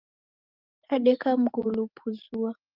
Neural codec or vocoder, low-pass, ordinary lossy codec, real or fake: none; 5.4 kHz; Opus, 32 kbps; real